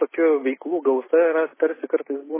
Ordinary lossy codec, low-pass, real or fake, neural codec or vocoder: MP3, 16 kbps; 3.6 kHz; real; none